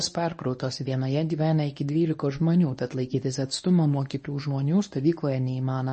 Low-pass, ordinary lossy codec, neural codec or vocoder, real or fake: 10.8 kHz; MP3, 32 kbps; codec, 24 kHz, 0.9 kbps, WavTokenizer, medium speech release version 1; fake